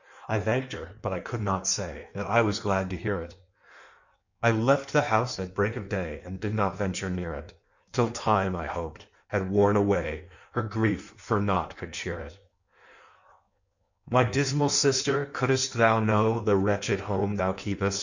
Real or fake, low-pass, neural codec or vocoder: fake; 7.2 kHz; codec, 16 kHz in and 24 kHz out, 1.1 kbps, FireRedTTS-2 codec